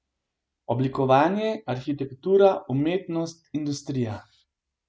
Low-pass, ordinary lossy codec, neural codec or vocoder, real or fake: none; none; none; real